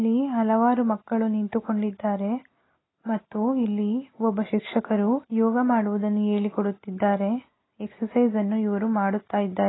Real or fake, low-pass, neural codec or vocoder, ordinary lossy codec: real; 7.2 kHz; none; AAC, 16 kbps